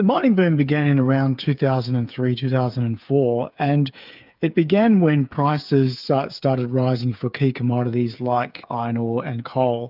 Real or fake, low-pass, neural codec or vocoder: fake; 5.4 kHz; codec, 16 kHz, 8 kbps, FreqCodec, smaller model